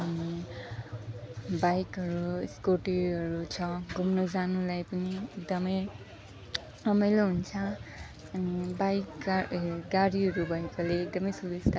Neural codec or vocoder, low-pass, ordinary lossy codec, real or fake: none; none; none; real